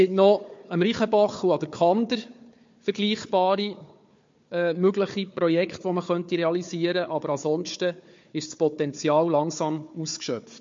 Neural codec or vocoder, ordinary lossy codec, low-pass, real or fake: codec, 16 kHz, 4 kbps, FunCodec, trained on Chinese and English, 50 frames a second; MP3, 48 kbps; 7.2 kHz; fake